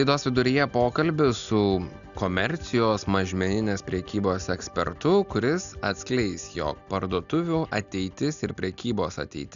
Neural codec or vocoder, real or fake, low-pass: none; real; 7.2 kHz